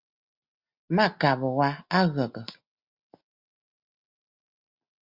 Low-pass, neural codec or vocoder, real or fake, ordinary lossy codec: 5.4 kHz; none; real; Opus, 64 kbps